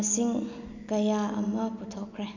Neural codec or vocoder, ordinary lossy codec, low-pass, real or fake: none; none; 7.2 kHz; real